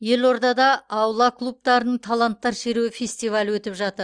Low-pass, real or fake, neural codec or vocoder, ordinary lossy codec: 9.9 kHz; real; none; Opus, 32 kbps